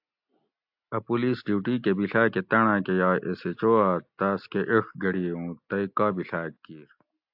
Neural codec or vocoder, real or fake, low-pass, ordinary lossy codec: none; real; 5.4 kHz; AAC, 48 kbps